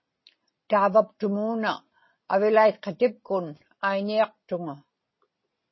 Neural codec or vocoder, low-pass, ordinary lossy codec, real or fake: none; 7.2 kHz; MP3, 24 kbps; real